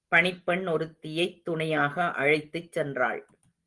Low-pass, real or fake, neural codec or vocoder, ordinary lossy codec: 10.8 kHz; real; none; Opus, 24 kbps